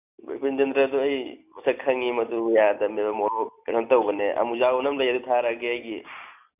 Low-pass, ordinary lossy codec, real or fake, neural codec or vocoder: 3.6 kHz; none; real; none